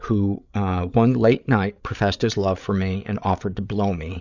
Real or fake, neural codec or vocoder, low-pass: fake; codec, 16 kHz, 8 kbps, FreqCodec, larger model; 7.2 kHz